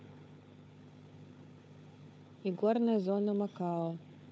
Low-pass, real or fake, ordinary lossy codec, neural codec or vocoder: none; fake; none; codec, 16 kHz, 4 kbps, FunCodec, trained on Chinese and English, 50 frames a second